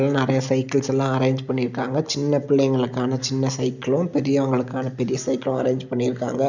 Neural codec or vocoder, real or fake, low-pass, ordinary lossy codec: none; real; 7.2 kHz; none